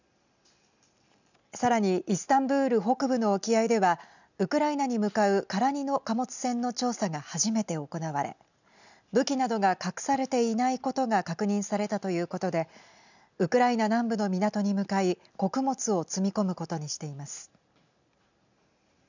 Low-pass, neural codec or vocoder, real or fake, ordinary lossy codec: 7.2 kHz; none; real; none